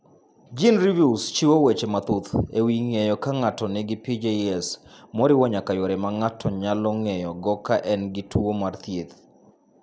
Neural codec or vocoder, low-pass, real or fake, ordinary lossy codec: none; none; real; none